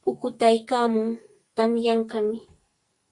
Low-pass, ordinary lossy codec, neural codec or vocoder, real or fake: 10.8 kHz; Opus, 64 kbps; codec, 44.1 kHz, 2.6 kbps, SNAC; fake